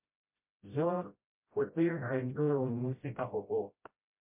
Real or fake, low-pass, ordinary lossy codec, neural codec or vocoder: fake; 3.6 kHz; MP3, 32 kbps; codec, 16 kHz, 0.5 kbps, FreqCodec, smaller model